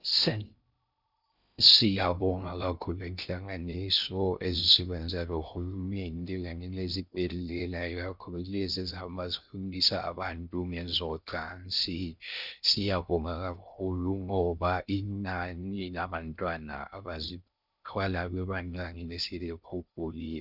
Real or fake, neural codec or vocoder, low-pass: fake; codec, 16 kHz in and 24 kHz out, 0.6 kbps, FocalCodec, streaming, 2048 codes; 5.4 kHz